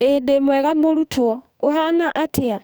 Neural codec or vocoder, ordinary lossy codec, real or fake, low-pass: codec, 44.1 kHz, 2.6 kbps, SNAC; none; fake; none